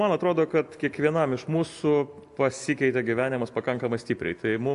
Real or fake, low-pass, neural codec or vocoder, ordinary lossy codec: real; 10.8 kHz; none; AAC, 64 kbps